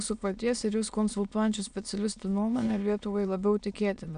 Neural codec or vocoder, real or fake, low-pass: autoencoder, 22.05 kHz, a latent of 192 numbers a frame, VITS, trained on many speakers; fake; 9.9 kHz